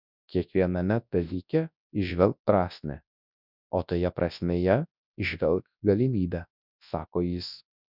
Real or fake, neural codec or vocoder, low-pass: fake; codec, 24 kHz, 0.9 kbps, WavTokenizer, large speech release; 5.4 kHz